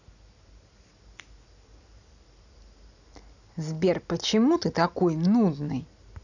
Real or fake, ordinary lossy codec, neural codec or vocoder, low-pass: real; none; none; 7.2 kHz